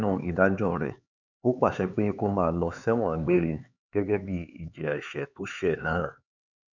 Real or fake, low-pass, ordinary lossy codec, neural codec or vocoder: fake; 7.2 kHz; none; codec, 16 kHz, 4 kbps, X-Codec, HuBERT features, trained on LibriSpeech